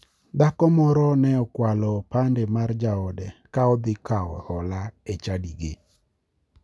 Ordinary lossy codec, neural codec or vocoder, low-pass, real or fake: none; none; none; real